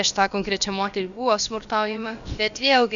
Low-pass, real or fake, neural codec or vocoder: 7.2 kHz; fake; codec, 16 kHz, about 1 kbps, DyCAST, with the encoder's durations